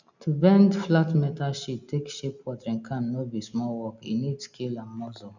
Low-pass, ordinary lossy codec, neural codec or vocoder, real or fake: 7.2 kHz; none; none; real